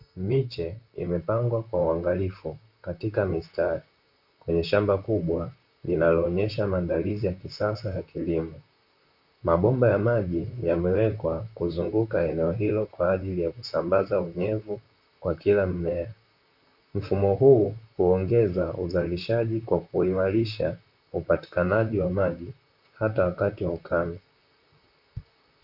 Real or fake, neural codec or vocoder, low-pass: fake; vocoder, 44.1 kHz, 128 mel bands, Pupu-Vocoder; 5.4 kHz